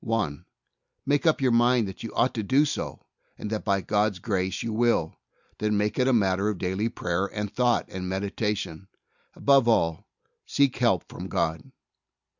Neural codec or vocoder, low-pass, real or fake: none; 7.2 kHz; real